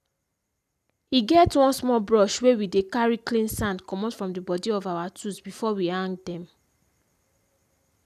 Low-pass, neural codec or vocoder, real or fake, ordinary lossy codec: 14.4 kHz; none; real; none